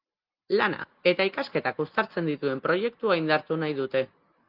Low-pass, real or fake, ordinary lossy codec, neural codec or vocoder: 5.4 kHz; real; Opus, 24 kbps; none